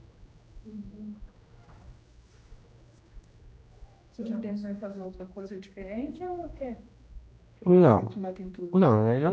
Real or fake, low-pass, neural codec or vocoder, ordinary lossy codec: fake; none; codec, 16 kHz, 1 kbps, X-Codec, HuBERT features, trained on general audio; none